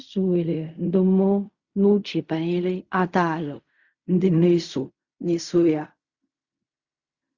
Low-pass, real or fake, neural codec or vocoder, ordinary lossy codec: 7.2 kHz; fake; codec, 16 kHz in and 24 kHz out, 0.4 kbps, LongCat-Audio-Codec, fine tuned four codebook decoder; Opus, 64 kbps